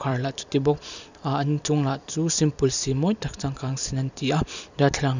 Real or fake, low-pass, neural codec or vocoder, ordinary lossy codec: fake; 7.2 kHz; vocoder, 44.1 kHz, 128 mel bands every 256 samples, BigVGAN v2; none